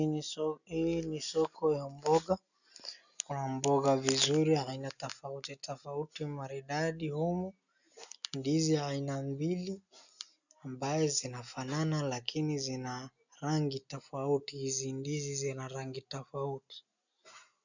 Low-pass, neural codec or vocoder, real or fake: 7.2 kHz; none; real